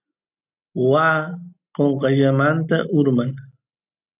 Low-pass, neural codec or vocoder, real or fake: 3.6 kHz; none; real